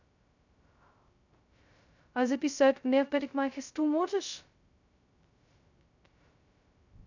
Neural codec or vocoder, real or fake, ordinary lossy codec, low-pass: codec, 16 kHz, 0.2 kbps, FocalCodec; fake; none; 7.2 kHz